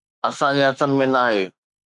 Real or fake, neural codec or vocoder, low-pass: fake; autoencoder, 48 kHz, 32 numbers a frame, DAC-VAE, trained on Japanese speech; 10.8 kHz